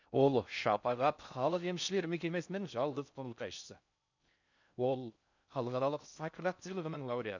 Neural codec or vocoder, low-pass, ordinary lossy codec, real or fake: codec, 16 kHz in and 24 kHz out, 0.6 kbps, FocalCodec, streaming, 4096 codes; 7.2 kHz; none; fake